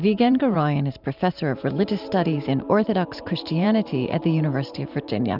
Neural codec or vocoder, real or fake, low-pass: vocoder, 44.1 kHz, 128 mel bands every 512 samples, BigVGAN v2; fake; 5.4 kHz